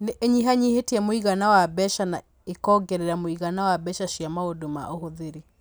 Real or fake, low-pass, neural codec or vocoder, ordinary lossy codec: real; none; none; none